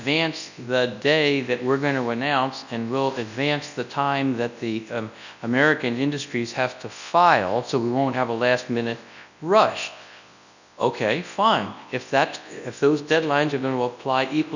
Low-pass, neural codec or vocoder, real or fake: 7.2 kHz; codec, 24 kHz, 0.9 kbps, WavTokenizer, large speech release; fake